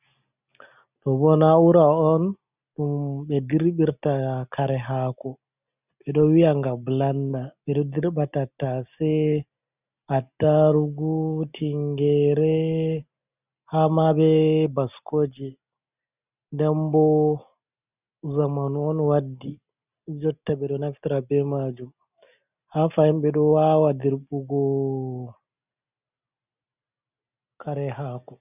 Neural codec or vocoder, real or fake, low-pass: none; real; 3.6 kHz